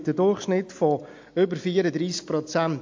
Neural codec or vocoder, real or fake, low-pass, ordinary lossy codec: none; real; 7.2 kHz; MP3, 48 kbps